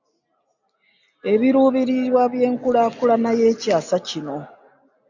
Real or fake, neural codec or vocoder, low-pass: real; none; 7.2 kHz